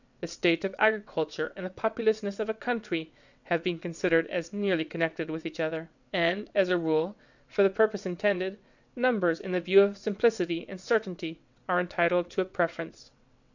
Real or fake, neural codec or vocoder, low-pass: fake; vocoder, 22.05 kHz, 80 mel bands, WaveNeXt; 7.2 kHz